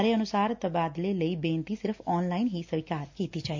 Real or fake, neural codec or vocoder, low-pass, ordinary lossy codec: real; none; 7.2 kHz; AAC, 48 kbps